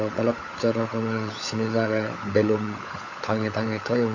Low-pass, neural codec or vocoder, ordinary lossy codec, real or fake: 7.2 kHz; codec, 16 kHz, 16 kbps, FunCodec, trained on LibriTTS, 50 frames a second; AAC, 48 kbps; fake